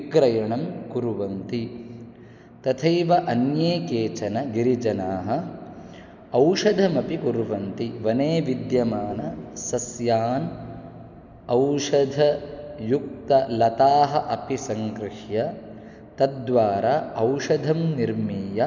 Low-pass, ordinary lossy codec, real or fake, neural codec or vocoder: 7.2 kHz; none; real; none